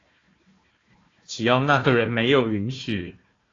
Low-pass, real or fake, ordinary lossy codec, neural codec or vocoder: 7.2 kHz; fake; AAC, 32 kbps; codec, 16 kHz, 1 kbps, FunCodec, trained on Chinese and English, 50 frames a second